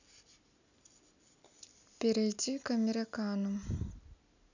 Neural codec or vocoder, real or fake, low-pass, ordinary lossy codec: none; real; 7.2 kHz; none